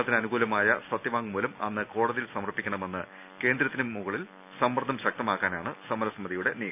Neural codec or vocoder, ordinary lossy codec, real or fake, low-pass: none; none; real; 3.6 kHz